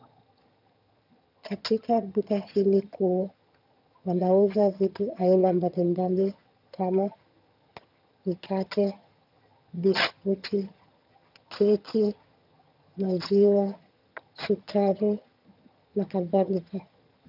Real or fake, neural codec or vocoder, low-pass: fake; vocoder, 22.05 kHz, 80 mel bands, HiFi-GAN; 5.4 kHz